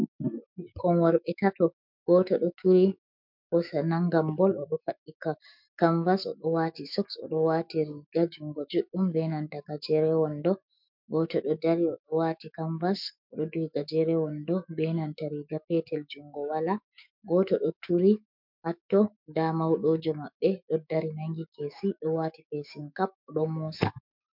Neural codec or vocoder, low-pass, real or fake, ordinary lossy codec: autoencoder, 48 kHz, 128 numbers a frame, DAC-VAE, trained on Japanese speech; 5.4 kHz; fake; MP3, 48 kbps